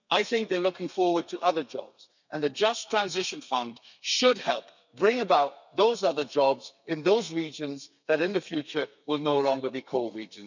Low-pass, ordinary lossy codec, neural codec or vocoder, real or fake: 7.2 kHz; none; codec, 32 kHz, 1.9 kbps, SNAC; fake